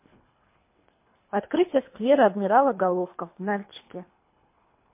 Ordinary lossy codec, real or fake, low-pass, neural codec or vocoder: MP3, 24 kbps; fake; 3.6 kHz; codec, 24 kHz, 3 kbps, HILCodec